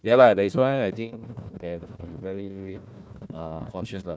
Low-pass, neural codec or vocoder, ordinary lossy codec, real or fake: none; codec, 16 kHz, 1 kbps, FunCodec, trained on Chinese and English, 50 frames a second; none; fake